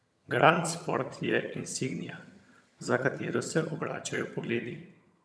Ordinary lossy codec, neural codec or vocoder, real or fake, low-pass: none; vocoder, 22.05 kHz, 80 mel bands, HiFi-GAN; fake; none